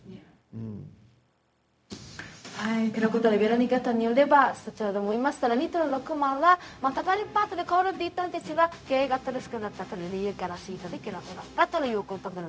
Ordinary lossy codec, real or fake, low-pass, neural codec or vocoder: none; fake; none; codec, 16 kHz, 0.4 kbps, LongCat-Audio-Codec